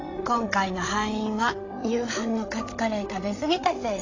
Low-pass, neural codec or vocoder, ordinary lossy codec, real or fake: 7.2 kHz; codec, 16 kHz, 16 kbps, FreqCodec, larger model; AAC, 32 kbps; fake